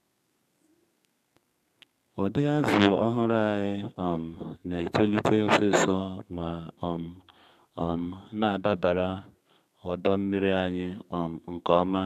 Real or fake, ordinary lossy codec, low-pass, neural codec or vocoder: fake; none; 14.4 kHz; codec, 32 kHz, 1.9 kbps, SNAC